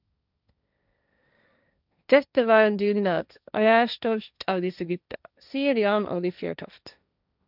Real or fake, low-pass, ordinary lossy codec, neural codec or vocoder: fake; 5.4 kHz; none; codec, 16 kHz, 1.1 kbps, Voila-Tokenizer